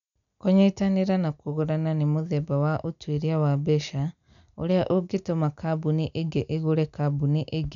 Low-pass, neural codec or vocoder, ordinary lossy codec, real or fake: 7.2 kHz; none; none; real